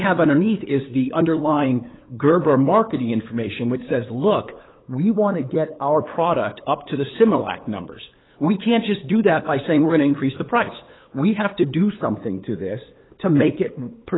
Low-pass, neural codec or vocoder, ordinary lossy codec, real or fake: 7.2 kHz; codec, 16 kHz, 8 kbps, FunCodec, trained on LibriTTS, 25 frames a second; AAC, 16 kbps; fake